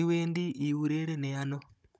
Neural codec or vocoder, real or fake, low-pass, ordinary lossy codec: codec, 16 kHz, 16 kbps, FunCodec, trained on Chinese and English, 50 frames a second; fake; none; none